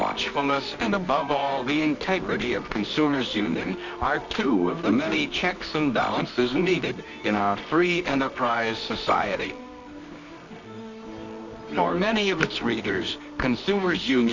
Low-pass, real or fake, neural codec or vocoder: 7.2 kHz; fake; codec, 24 kHz, 0.9 kbps, WavTokenizer, medium music audio release